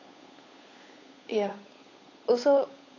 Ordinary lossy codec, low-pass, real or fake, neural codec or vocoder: AAC, 32 kbps; 7.2 kHz; fake; codec, 16 kHz, 8 kbps, FunCodec, trained on Chinese and English, 25 frames a second